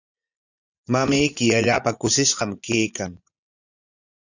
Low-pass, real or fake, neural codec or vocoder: 7.2 kHz; fake; vocoder, 44.1 kHz, 80 mel bands, Vocos